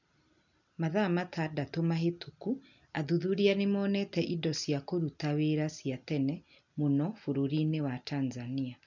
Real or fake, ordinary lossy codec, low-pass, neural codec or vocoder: real; none; 7.2 kHz; none